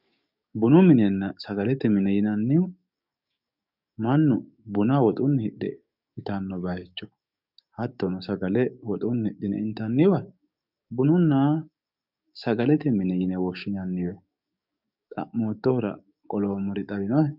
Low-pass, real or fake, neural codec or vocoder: 5.4 kHz; fake; codec, 44.1 kHz, 7.8 kbps, DAC